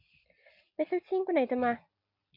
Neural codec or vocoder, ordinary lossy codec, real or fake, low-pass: none; AAC, 48 kbps; real; 5.4 kHz